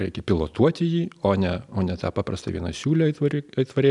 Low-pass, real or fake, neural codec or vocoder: 10.8 kHz; real; none